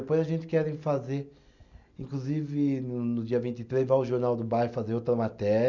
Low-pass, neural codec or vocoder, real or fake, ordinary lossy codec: 7.2 kHz; none; real; none